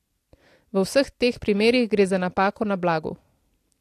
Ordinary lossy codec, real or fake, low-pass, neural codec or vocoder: AAC, 64 kbps; real; 14.4 kHz; none